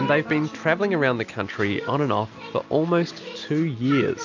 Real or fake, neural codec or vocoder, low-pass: real; none; 7.2 kHz